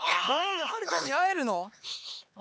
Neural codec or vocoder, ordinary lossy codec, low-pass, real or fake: codec, 16 kHz, 4 kbps, X-Codec, HuBERT features, trained on LibriSpeech; none; none; fake